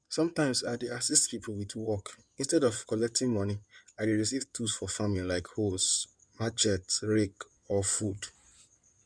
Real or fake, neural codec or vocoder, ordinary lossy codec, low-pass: fake; codec, 16 kHz in and 24 kHz out, 2.2 kbps, FireRedTTS-2 codec; none; 9.9 kHz